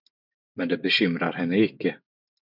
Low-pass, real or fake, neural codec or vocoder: 5.4 kHz; real; none